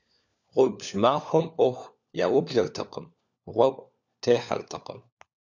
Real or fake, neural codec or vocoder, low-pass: fake; codec, 16 kHz, 4 kbps, FunCodec, trained on LibriTTS, 50 frames a second; 7.2 kHz